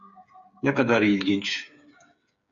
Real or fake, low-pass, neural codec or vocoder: fake; 7.2 kHz; codec, 16 kHz, 8 kbps, FreqCodec, smaller model